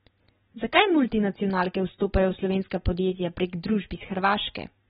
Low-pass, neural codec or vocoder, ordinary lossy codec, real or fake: 7.2 kHz; none; AAC, 16 kbps; real